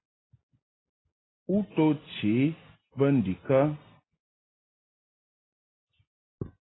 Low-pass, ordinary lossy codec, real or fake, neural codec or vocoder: 7.2 kHz; AAC, 16 kbps; real; none